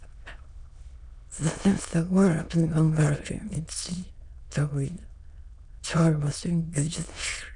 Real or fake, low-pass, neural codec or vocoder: fake; 9.9 kHz; autoencoder, 22.05 kHz, a latent of 192 numbers a frame, VITS, trained on many speakers